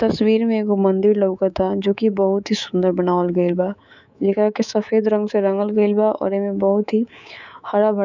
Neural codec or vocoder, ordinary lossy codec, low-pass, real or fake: codec, 24 kHz, 3.1 kbps, DualCodec; none; 7.2 kHz; fake